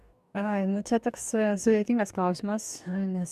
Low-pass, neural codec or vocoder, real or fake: 14.4 kHz; codec, 44.1 kHz, 2.6 kbps, DAC; fake